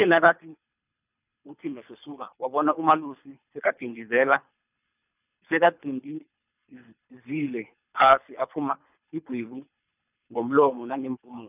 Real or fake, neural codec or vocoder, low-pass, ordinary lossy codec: fake; codec, 24 kHz, 3 kbps, HILCodec; 3.6 kHz; none